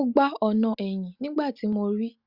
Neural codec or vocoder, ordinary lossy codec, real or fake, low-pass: none; Opus, 64 kbps; real; 5.4 kHz